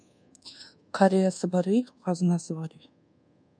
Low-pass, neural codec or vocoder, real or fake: 9.9 kHz; codec, 24 kHz, 1.2 kbps, DualCodec; fake